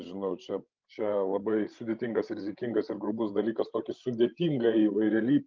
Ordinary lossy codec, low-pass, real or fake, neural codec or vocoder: Opus, 24 kbps; 7.2 kHz; fake; codec, 16 kHz, 16 kbps, FreqCodec, larger model